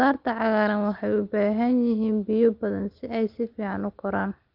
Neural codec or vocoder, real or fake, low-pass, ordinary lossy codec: none; real; 5.4 kHz; Opus, 24 kbps